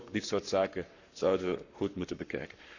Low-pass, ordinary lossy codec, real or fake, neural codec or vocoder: 7.2 kHz; none; fake; codec, 44.1 kHz, 7.8 kbps, Pupu-Codec